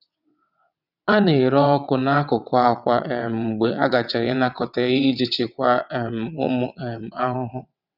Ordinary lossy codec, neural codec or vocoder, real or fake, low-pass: none; vocoder, 22.05 kHz, 80 mel bands, WaveNeXt; fake; 5.4 kHz